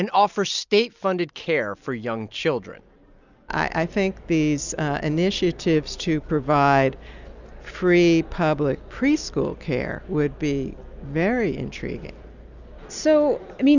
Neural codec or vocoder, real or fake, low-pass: none; real; 7.2 kHz